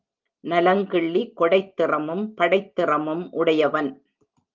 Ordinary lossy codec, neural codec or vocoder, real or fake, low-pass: Opus, 32 kbps; none; real; 7.2 kHz